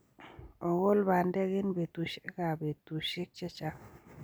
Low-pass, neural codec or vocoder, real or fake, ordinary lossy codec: none; none; real; none